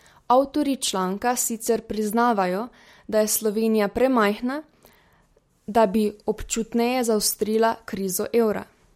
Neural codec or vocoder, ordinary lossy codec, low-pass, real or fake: none; MP3, 64 kbps; 19.8 kHz; real